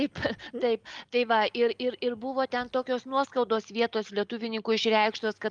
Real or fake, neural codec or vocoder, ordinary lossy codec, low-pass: real; none; Opus, 32 kbps; 9.9 kHz